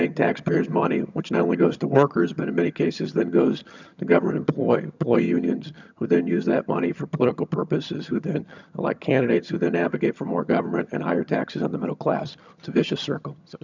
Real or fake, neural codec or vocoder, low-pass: fake; vocoder, 22.05 kHz, 80 mel bands, HiFi-GAN; 7.2 kHz